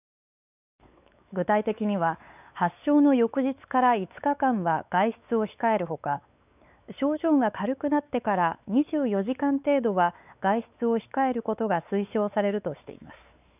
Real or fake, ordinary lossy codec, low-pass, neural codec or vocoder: fake; none; 3.6 kHz; codec, 16 kHz, 4 kbps, X-Codec, WavLM features, trained on Multilingual LibriSpeech